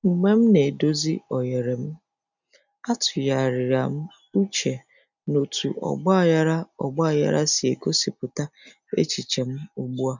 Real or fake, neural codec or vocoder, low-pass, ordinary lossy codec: real; none; 7.2 kHz; none